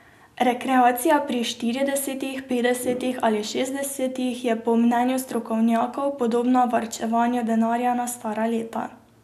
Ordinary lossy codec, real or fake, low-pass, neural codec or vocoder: none; real; 14.4 kHz; none